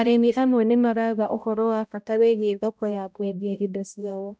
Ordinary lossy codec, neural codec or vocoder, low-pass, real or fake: none; codec, 16 kHz, 0.5 kbps, X-Codec, HuBERT features, trained on balanced general audio; none; fake